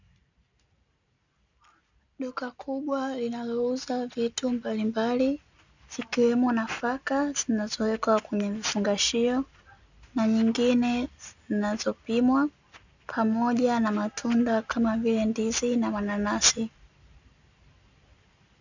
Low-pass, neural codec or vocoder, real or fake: 7.2 kHz; none; real